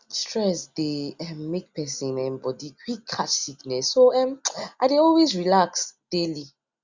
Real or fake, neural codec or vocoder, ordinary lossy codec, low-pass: real; none; Opus, 64 kbps; 7.2 kHz